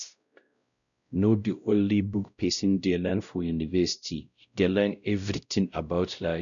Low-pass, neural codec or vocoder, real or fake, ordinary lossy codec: 7.2 kHz; codec, 16 kHz, 0.5 kbps, X-Codec, WavLM features, trained on Multilingual LibriSpeech; fake; none